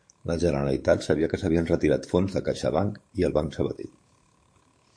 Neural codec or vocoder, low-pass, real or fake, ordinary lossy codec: codec, 44.1 kHz, 7.8 kbps, DAC; 9.9 kHz; fake; MP3, 48 kbps